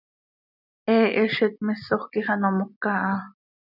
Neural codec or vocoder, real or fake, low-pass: none; real; 5.4 kHz